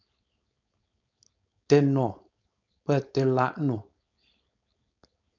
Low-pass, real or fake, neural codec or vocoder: 7.2 kHz; fake; codec, 16 kHz, 4.8 kbps, FACodec